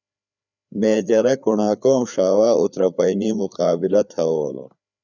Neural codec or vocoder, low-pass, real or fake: codec, 16 kHz, 4 kbps, FreqCodec, larger model; 7.2 kHz; fake